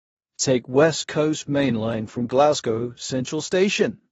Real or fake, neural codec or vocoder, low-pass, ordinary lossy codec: fake; codec, 16 kHz in and 24 kHz out, 0.9 kbps, LongCat-Audio-Codec, four codebook decoder; 10.8 kHz; AAC, 24 kbps